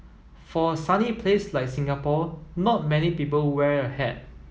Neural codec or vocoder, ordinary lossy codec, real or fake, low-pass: none; none; real; none